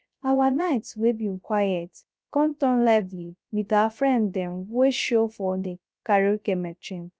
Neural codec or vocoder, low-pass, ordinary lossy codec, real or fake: codec, 16 kHz, 0.3 kbps, FocalCodec; none; none; fake